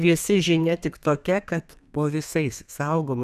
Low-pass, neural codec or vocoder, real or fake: 14.4 kHz; codec, 32 kHz, 1.9 kbps, SNAC; fake